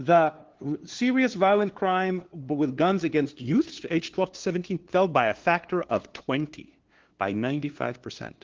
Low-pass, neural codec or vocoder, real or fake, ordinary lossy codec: 7.2 kHz; codec, 16 kHz, 2 kbps, FunCodec, trained on LibriTTS, 25 frames a second; fake; Opus, 16 kbps